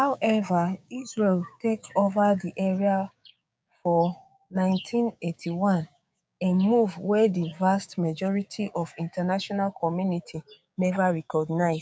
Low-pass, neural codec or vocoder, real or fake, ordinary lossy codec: none; codec, 16 kHz, 6 kbps, DAC; fake; none